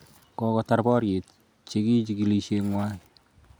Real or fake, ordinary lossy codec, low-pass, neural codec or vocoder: real; none; none; none